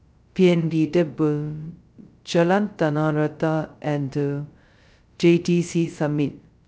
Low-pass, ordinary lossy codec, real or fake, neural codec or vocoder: none; none; fake; codec, 16 kHz, 0.2 kbps, FocalCodec